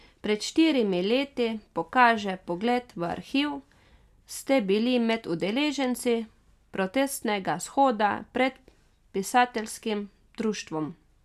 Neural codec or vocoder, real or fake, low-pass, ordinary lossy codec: none; real; 14.4 kHz; none